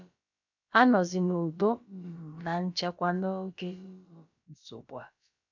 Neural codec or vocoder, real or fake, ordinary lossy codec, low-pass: codec, 16 kHz, about 1 kbps, DyCAST, with the encoder's durations; fake; none; 7.2 kHz